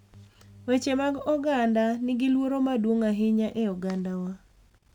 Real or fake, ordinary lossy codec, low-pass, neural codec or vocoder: real; none; 19.8 kHz; none